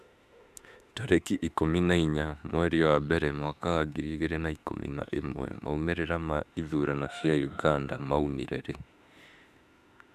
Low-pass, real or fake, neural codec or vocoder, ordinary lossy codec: 14.4 kHz; fake; autoencoder, 48 kHz, 32 numbers a frame, DAC-VAE, trained on Japanese speech; none